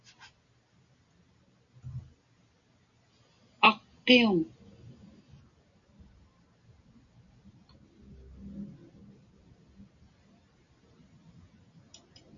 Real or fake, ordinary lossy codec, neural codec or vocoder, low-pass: real; MP3, 64 kbps; none; 7.2 kHz